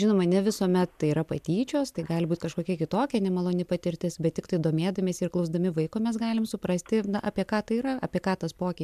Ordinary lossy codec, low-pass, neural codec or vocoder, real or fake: MP3, 96 kbps; 14.4 kHz; none; real